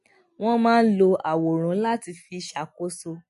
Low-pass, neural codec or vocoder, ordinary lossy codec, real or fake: 14.4 kHz; none; MP3, 48 kbps; real